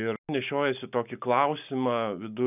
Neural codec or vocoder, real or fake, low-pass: none; real; 3.6 kHz